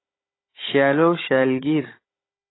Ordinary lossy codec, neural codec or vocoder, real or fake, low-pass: AAC, 16 kbps; codec, 16 kHz, 16 kbps, FunCodec, trained on Chinese and English, 50 frames a second; fake; 7.2 kHz